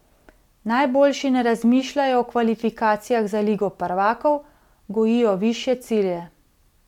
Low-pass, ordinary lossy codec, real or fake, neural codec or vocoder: 19.8 kHz; MP3, 96 kbps; real; none